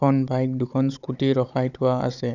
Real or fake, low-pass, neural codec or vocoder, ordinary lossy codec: fake; 7.2 kHz; codec, 16 kHz, 16 kbps, FunCodec, trained on Chinese and English, 50 frames a second; none